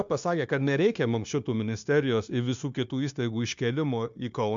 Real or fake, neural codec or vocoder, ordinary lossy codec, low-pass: fake; codec, 16 kHz, 0.9 kbps, LongCat-Audio-Codec; MP3, 64 kbps; 7.2 kHz